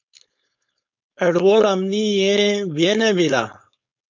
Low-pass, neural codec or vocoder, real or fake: 7.2 kHz; codec, 16 kHz, 4.8 kbps, FACodec; fake